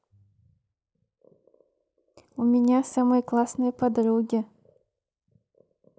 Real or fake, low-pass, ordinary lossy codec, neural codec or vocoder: real; none; none; none